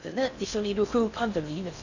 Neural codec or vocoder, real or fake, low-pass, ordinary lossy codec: codec, 16 kHz in and 24 kHz out, 0.6 kbps, FocalCodec, streaming, 4096 codes; fake; 7.2 kHz; none